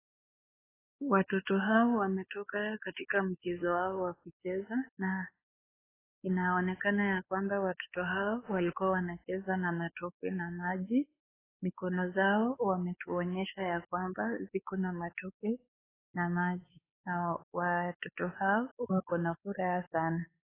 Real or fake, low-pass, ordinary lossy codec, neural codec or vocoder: fake; 3.6 kHz; AAC, 16 kbps; codec, 16 kHz, 4 kbps, X-Codec, WavLM features, trained on Multilingual LibriSpeech